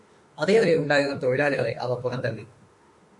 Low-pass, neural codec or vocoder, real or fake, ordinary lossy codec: 10.8 kHz; autoencoder, 48 kHz, 32 numbers a frame, DAC-VAE, trained on Japanese speech; fake; MP3, 48 kbps